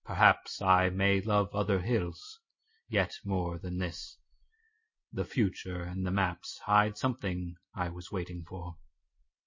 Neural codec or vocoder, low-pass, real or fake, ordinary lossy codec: none; 7.2 kHz; real; MP3, 32 kbps